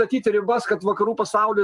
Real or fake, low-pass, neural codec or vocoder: fake; 10.8 kHz; vocoder, 44.1 kHz, 128 mel bands every 256 samples, BigVGAN v2